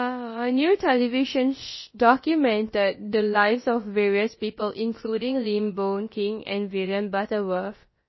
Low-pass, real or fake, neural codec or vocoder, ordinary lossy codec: 7.2 kHz; fake; codec, 16 kHz, about 1 kbps, DyCAST, with the encoder's durations; MP3, 24 kbps